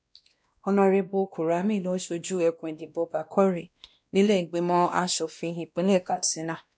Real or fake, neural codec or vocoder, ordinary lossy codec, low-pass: fake; codec, 16 kHz, 1 kbps, X-Codec, WavLM features, trained on Multilingual LibriSpeech; none; none